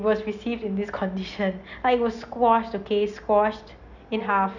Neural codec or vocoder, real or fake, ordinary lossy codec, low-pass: none; real; none; 7.2 kHz